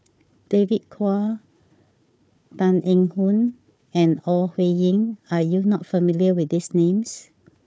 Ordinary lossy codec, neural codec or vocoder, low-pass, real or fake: none; codec, 16 kHz, 4 kbps, FunCodec, trained on Chinese and English, 50 frames a second; none; fake